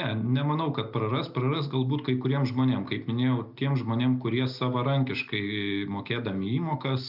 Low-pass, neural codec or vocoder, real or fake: 5.4 kHz; none; real